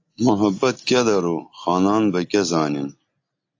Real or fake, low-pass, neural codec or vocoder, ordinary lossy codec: real; 7.2 kHz; none; AAC, 48 kbps